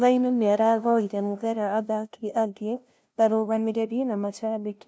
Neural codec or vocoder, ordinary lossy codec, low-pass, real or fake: codec, 16 kHz, 0.5 kbps, FunCodec, trained on LibriTTS, 25 frames a second; none; none; fake